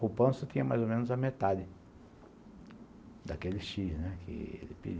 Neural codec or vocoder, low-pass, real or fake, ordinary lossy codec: none; none; real; none